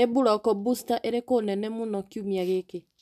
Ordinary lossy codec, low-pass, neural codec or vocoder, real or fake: none; 14.4 kHz; none; real